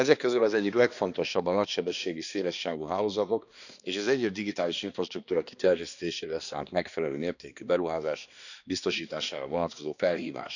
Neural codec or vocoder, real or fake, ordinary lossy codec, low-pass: codec, 16 kHz, 2 kbps, X-Codec, HuBERT features, trained on balanced general audio; fake; none; 7.2 kHz